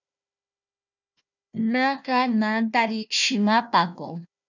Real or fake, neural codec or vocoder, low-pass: fake; codec, 16 kHz, 1 kbps, FunCodec, trained on Chinese and English, 50 frames a second; 7.2 kHz